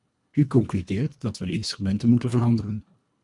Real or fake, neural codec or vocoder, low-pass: fake; codec, 24 kHz, 1.5 kbps, HILCodec; 10.8 kHz